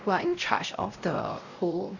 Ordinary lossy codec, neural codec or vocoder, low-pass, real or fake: none; codec, 16 kHz, 0.5 kbps, X-Codec, WavLM features, trained on Multilingual LibriSpeech; 7.2 kHz; fake